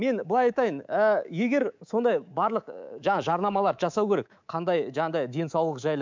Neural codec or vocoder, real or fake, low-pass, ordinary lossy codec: codec, 24 kHz, 3.1 kbps, DualCodec; fake; 7.2 kHz; MP3, 64 kbps